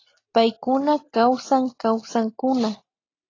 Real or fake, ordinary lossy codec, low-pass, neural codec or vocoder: real; AAC, 32 kbps; 7.2 kHz; none